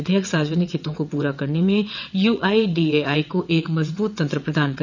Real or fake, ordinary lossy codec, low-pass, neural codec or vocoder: fake; none; 7.2 kHz; vocoder, 22.05 kHz, 80 mel bands, WaveNeXt